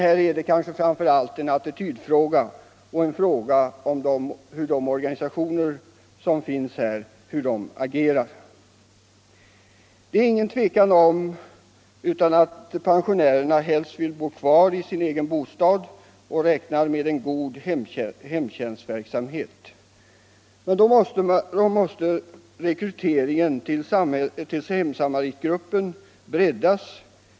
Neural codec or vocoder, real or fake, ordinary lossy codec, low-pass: none; real; none; none